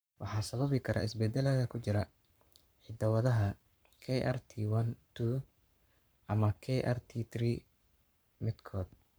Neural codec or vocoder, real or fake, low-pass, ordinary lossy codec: codec, 44.1 kHz, 7.8 kbps, Pupu-Codec; fake; none; none